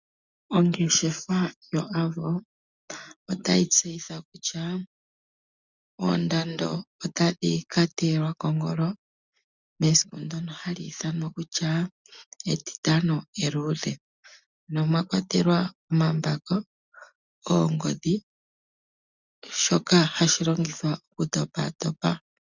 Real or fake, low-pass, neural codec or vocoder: real; 7.2 kHz; none